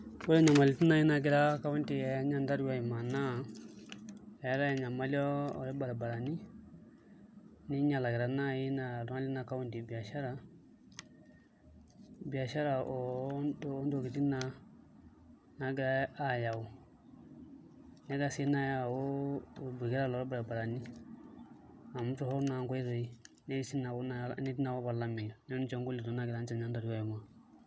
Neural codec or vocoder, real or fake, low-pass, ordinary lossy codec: none; real; none; none